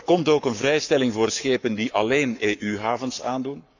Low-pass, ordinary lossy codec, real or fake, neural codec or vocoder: 7.2 kHz; none; fake; codec, 44.1 kHz, 7.8 kbps, Pupu-Codec